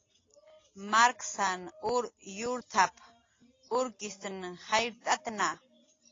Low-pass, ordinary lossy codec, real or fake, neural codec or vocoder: 7.2 kHz; AAC, 32 kbps; real; none